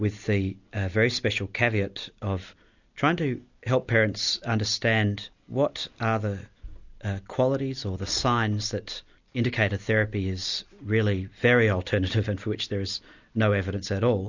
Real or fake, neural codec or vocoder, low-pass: real; none; 7.2 kHz